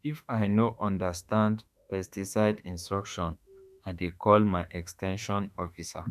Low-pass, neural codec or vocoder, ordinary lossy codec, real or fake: 14.4 kHz; autoencoder, 48 kHz, 32 numbers a frame, DAC-VAE, trained on Japanese speech; none; fake